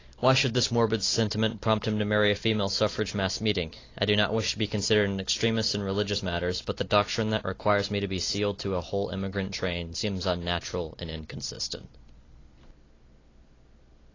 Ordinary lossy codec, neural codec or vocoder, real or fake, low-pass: AAC, 32 kbps; none; real; 7.2 kHz